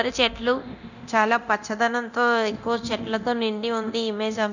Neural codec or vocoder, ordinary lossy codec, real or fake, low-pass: codec, 24 kHz, 0.9 kbps, DualCodec; none; fake; 7.2 kHz